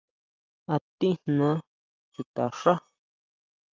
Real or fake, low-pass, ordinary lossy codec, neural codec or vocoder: real; 7.2 kHz; Opus, 32 kbps; none